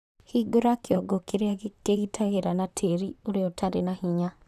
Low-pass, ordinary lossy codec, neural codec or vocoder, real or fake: 14.4 kHz; none; vocoder, 44.1 kHz, 128 mel bands, Pupu-Vocoder; fake